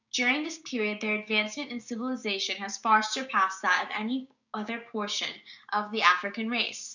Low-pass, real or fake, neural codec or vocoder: 7.2 kHz; fake; codec, 16 kHz, 6 kbps, DAC